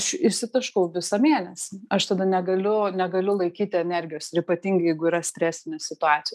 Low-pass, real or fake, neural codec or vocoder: 14.4 kHz; real; none